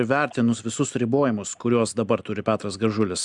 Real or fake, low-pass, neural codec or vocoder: real; 10.8 kHz; none